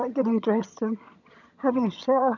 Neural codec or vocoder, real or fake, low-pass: vocoder, 22.05 kHz, 80 mel bands, HiFi-GAN; fake; 7.2 kHz